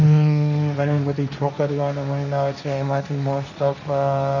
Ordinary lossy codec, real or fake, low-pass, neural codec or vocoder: none; fake; 7.2 kHz; codec, 16 kHz, 1.1 kbps, Voila-Tokenizer